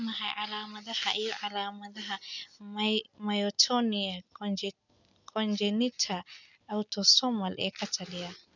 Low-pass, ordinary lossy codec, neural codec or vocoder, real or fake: 7.2 kHz; none; none; real